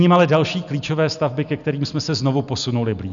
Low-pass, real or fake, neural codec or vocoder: 7.2 kHz; real; none